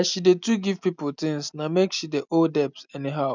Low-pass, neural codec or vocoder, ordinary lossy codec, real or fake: 7.2 kHz; none; none; real